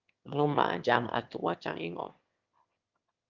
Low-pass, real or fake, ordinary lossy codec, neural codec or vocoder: 7.2 kHz; fake; Opus, 24 kbps; autoencoder, 22.05 kHz, a latent of 192 numbers a frame, VITS, trained on one speaker